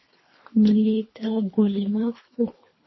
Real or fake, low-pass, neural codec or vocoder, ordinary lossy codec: fake; 7.2 kHz; codec, 24 kHz, 3 kbps, HILCodec; MP3, 24 kbps